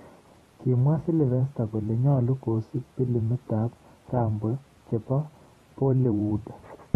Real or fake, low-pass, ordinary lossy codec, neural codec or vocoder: fake; 19.8 kHz; AAC, 32 kbps; vocoder, 44.1 kHz, 128 mel bands every 512 samples, BigVGAN v2